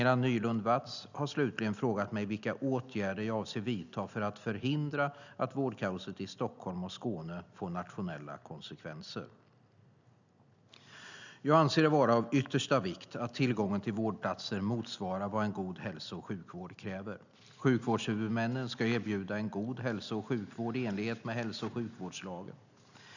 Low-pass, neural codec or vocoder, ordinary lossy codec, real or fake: 7.2 kHz; none; none; real